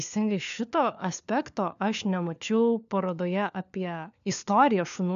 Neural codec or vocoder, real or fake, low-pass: codec, 16 kHz, 4 kbps, FunCodec, trained on LibriTTS, 50 frames a second; fake; 7.2 kHz